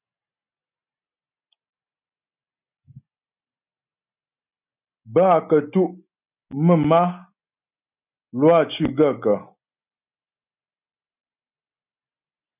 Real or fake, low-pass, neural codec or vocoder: real; 3.6 kHz; none